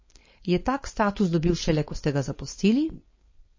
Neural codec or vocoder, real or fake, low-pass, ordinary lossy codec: codec, 16 kHz, 4.8 kbps, FACodec; fake; 7.2 kHz; MP3, 32 kbps